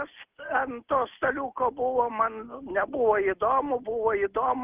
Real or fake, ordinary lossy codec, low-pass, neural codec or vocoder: real; Opus, 24 kbps; 3.6 kHz; none